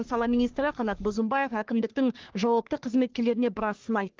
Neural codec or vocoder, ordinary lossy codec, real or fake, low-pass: codec, 44.1 kHz, 3.4 kbps, Pupu-Codec; Opus, 16 kbps; fake; 7.2 kHz